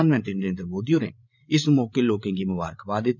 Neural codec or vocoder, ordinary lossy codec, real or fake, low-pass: codec, 16 kHz, 8 kbps, FreqCodec, larger model; none; fake; 7.2 kHz